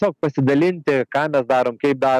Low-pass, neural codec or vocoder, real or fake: 14.4 kHz; none; real